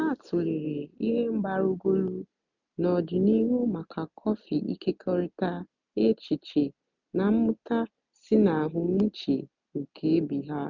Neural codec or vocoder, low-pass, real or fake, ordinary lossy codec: none; 7.2 kHz; real; none